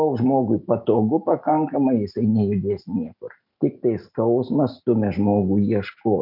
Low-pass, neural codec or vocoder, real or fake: 5.4 kHz; none; real